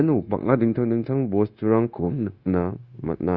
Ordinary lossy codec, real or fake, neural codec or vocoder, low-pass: Opus, 64 kbps; fake; codec, 16 kHz, 0.9 kbps, LongCat-Audio-Codec; 7.2 kHz